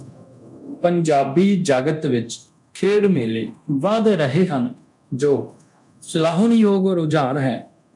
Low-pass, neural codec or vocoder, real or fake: 10.8 kHz; codec, 24 kHz, 0.9 kbps, DualCodec; fake